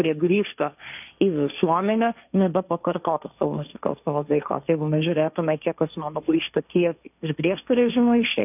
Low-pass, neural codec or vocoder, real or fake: 3.6 kHz; codec, 16 kHz, 1.1 kbps, Voila-Tokenizer; fake